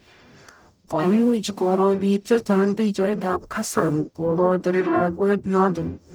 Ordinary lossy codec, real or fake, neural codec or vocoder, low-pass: none; fake; codec, 44.1 kHz, 0.9 kbps, DAC; none